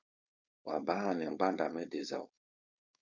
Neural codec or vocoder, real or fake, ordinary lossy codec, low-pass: codec, 16 kHz, 4.8 kbps, FACodec; fake; Opus, 64 kbps; 7.2 kHz